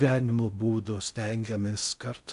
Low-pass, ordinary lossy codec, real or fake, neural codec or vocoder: 10.8 kHz; MP3, 96 kbps; fake; codec, 16 kHz in and 24 kHz out, 0.6 kbps, FocalCodec, streaming, 4096 codes